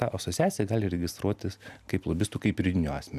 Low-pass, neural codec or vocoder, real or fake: 14.4 kHz; none; real